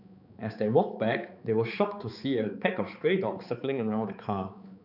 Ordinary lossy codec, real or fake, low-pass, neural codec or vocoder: none; fake; 5.4 kHz; codec, 16 kHz, 4 kbps, X-Codec, HuBERT features, trained on balanced general audio